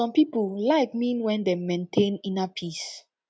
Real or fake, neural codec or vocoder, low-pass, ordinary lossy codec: real; none; none; none